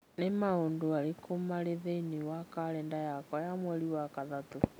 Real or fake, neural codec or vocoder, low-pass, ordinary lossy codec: real; none; none; none